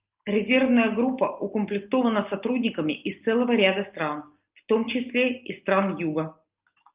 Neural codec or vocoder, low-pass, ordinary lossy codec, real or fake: none; 3.6 kHz; Opus, 24 kbps; real